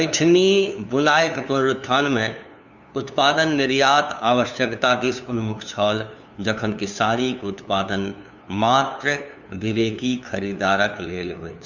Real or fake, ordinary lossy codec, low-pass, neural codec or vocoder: fake; none; 7.2 kHz; codec, 16 kHz, 2 kbps, FunCodec, trained on LibriTTS, 25 frames a second